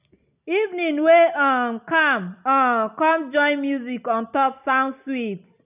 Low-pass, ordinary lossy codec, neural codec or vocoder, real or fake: 3.6 kHz; none; none; real